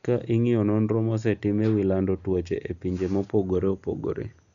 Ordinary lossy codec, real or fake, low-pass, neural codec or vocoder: none; real; 7.2 kHz; none